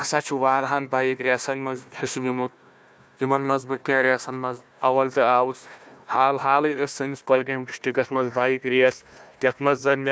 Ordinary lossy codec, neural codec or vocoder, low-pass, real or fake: none; codec, 16 kHz, 1 kbps, FunCodec, trained on Chinese and English, 50 frames a second; none; fake